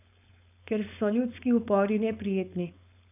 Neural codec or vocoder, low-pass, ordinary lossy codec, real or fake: codec, 16 kHz, 4.8 kbps, FACodec; 3.6 kHz; none; fake